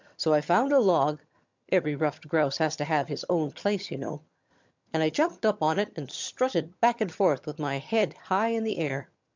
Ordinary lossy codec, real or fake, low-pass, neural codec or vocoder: MP3, 64 kbps; fake; 7.2 kHz; vocoder, 22.05 kHz, 80 mel bands, HiFi-GAN